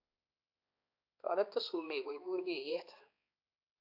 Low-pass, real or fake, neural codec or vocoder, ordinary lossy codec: 5.4 kHz; fake; codec, 16 kHz, 2 kbps, X-Codec, HuBERT features, trained on balanced general audio; AAC, 48 kbps